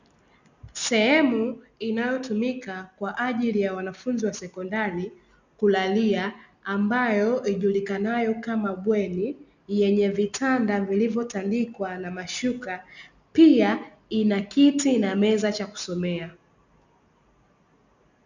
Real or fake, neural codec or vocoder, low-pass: real; none; 7.2 kHz